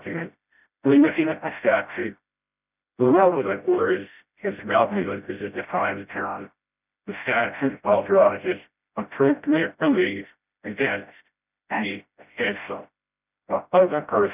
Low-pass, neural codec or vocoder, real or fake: 3.6 kHz; codec, 16 kHz, 0.5 kbps, FreqCodec, smaller model; fake